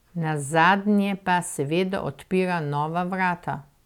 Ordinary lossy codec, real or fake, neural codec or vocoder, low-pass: none; real; none; 19.8 kHz